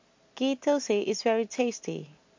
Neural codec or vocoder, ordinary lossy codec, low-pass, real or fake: none; MP3, 48 kbps; 7.2 kHz; real